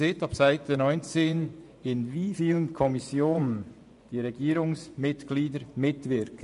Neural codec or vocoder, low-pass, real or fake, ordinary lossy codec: vocoder, 24 kHz, 100 mel bands, Vocos; 10.8 kHz; fake; none